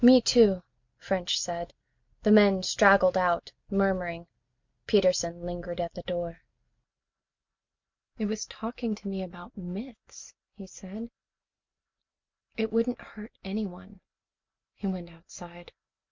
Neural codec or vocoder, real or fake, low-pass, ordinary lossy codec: none; real; 7.2 kHz; MP3, 64 kbps